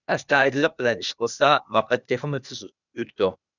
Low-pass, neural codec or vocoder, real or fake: 7.2 kHz; codec, 16 kHz, 0.8 kbps, ZipCodec; fake